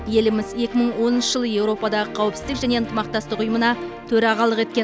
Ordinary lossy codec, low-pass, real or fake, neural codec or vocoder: none; none; real; none